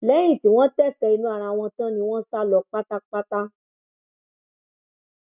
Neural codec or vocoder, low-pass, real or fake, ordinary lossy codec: none; 3.6 kHz; real; none